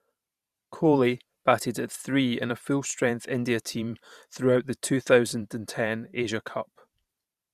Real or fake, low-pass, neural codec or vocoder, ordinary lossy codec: fake; 14.4 kHz; vocoder, 44.1 kHz, 128 mel bands every 512 samples, BigVGAN v2; Opus, 64 kbps